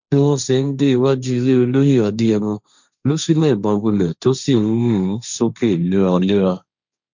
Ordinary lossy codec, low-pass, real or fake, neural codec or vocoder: none; 7.2 kHz; fake; codec, 16 kHz, 1.1 kbps, Voila-Tokenizer